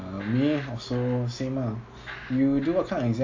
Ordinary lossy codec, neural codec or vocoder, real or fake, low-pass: AAC, 48 kbps; none; real; 7.2 kHz